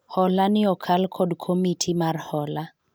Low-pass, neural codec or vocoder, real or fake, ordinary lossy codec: none; none; real; none